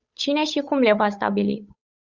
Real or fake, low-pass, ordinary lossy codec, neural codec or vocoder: fake; 7.2 kHz; Opus, 64 kbps; codec, 16 kHz, 8 kbps, FunCodec, trained on Chinese and English, 25 frames a second